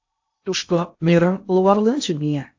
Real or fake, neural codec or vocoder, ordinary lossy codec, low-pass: fake; codec, 16 kHz in and 24 kHz out, 0.6 kbps, FocalCodec, streaming, 2048 codes; AAC, 48 kbps; 7.2 kHz